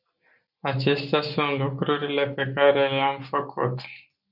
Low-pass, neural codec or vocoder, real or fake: 5.4 kHz; none; real